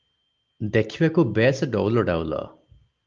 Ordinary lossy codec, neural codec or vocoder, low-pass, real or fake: Opus, 24 kbps; none; 7.2 kHz; real